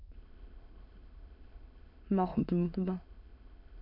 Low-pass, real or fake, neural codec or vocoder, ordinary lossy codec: 5.4 kHz; fake; autoencoder, 22.05 kHz, a latent of 192 numbers a frame, VITS, trained on many speakers; none